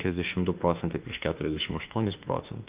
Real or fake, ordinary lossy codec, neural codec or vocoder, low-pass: fake; Opus, 24 kbps; autoencoder, 48 kHz, 32 numbers a frame, DAC-VAE, trained on Japanese speech; 3.6 kHz